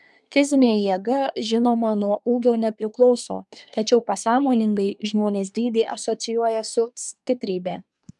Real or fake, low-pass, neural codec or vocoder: fake; 10.8 kHz; codec, 24 kHz, 1 kbps, SNAC